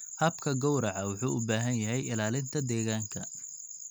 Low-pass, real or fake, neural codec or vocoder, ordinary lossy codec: none; real; none; none